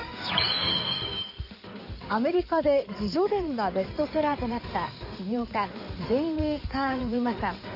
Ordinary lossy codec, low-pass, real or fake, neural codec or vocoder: none; 5.4 kHz; fake; codec, 16 kHz in and 24 kHz out, 2.2 kbps, FireRedTTS-2 codec